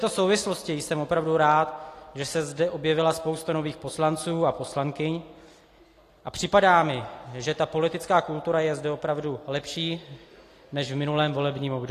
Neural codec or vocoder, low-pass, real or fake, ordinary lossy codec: none; 14.4 kHz; real; AAC, 48 kbps